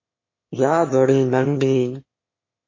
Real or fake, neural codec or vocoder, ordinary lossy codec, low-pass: fake; autoencoder, 22.05 kHz, a latent of 192 numbers a frame, VITS, trained on one speaker; MP3, 32 kbps; 7.2 kHz